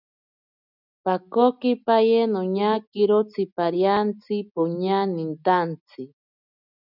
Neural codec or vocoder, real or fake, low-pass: none; real; 5.4 kHz